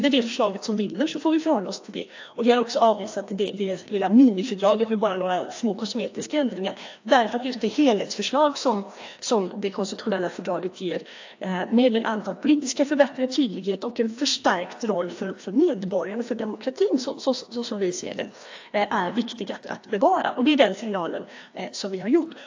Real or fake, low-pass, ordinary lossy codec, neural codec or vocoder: fake; 7.2 kHz; AAC, 48 kbps; codec, 16 kHz, 1 kbps, FreqCodec, larger model